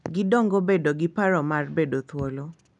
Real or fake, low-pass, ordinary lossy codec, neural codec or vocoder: real; 10.8 kHz; none; none